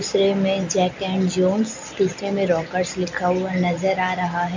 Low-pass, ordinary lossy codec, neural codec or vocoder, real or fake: 7.2 kHz; MP3, 64 kbps; none; real